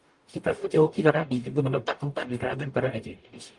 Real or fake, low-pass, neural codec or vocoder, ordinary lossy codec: fake; 10.8 kHz; codec, 44.1 kHz, 0.9 kbps, DAC; Opus, 32 kbps